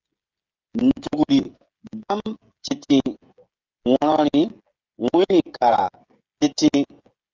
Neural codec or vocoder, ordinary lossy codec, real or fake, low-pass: codec, 16 kHz, 16 kbps, FreqCodec, smaller model; Opus, 32 kbps; fake; 7.2 kHz